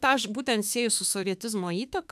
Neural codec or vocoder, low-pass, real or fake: autoencoder, 48 kHz, 32 numbers a frame, DAC-VAE, trained on Japanese speech; 14.4 kHz; fake